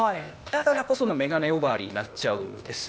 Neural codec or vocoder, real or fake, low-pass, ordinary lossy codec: codec, 16 kHz, 0.8 kbps, ZipCodec; fake; none; none